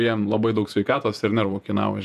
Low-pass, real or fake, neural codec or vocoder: 14.4 kHz; fake; vocoder, 48 kHz, 128 mel bands, Vocos